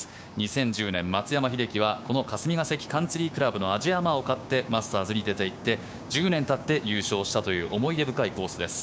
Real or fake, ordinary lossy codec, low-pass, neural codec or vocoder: fake; none; none; codec, 16 kHz, 6 kbps, DAC